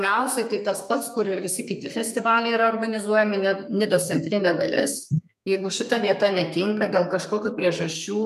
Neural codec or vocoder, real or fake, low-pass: codec, 32 kHz, 1.9 kbps, SNAC; fake; 14.4 kHz